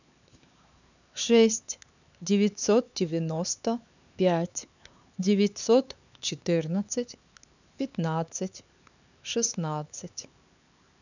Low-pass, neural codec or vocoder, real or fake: 7.2 kHz; codec, 16 kHz, 4 kbps, X-Codec, HuBERT features, trained on LibriSpeech; fake